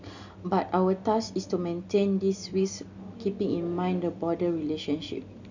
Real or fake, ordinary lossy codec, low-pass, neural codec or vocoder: real; none; 7.2 kHz; none